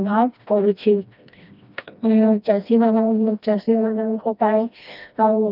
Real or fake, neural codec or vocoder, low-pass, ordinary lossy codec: fake; codec, 16 kHz, 1 kbps, FreqCodec, smaller model; 5.4 kHz; none